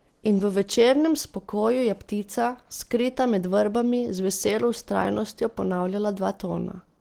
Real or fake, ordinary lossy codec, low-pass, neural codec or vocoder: real; Opus, 16 kbps; 19.8 kHz; none